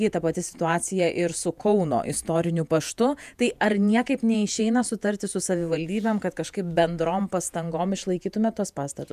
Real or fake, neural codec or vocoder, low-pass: fake; vocoder, 48 kHz, 128 mel bands, Vocos; 14.4 kHz